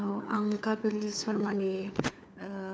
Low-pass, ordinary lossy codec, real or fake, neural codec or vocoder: none; none; fake; codec, 16 kHz, 4 kbps, FunCodec, trained on LibriTTS, 50 frames a second